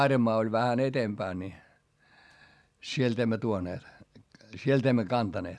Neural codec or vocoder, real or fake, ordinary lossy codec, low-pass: none; real; none; none